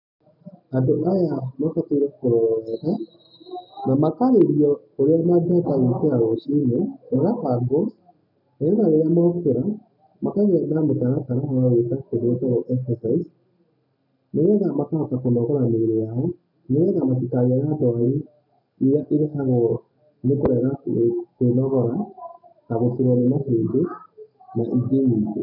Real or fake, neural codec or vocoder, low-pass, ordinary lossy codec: real; none; 5.4 kHz; none